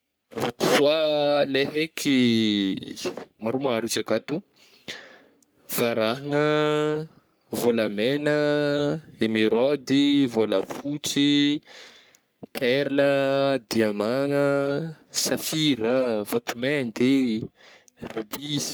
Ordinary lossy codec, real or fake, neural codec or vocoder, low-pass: none; fake; codec, 44.1 kHz, 3.4 kbps, Pupu-Codec; none